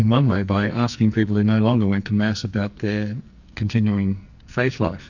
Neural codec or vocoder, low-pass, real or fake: codec, 44.1 kHz, 2.6 kbps, SNAC; 7.2 kHz; fake